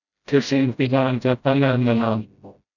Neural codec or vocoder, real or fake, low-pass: codec, 16 kHz, 0.5 kbps, FreqCodec, smaller model; fake; 7.2 kHz